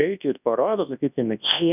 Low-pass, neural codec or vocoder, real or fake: 3.6 kHz; codec, 24 kHz, 0.9 kbps, WavTokenizer, large speech release; fake